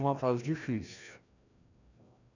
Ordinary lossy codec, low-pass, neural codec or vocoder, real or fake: none; 7.2 kHz; codec, 16 kHz, 1 kbps, FreqCodec, larger model; fake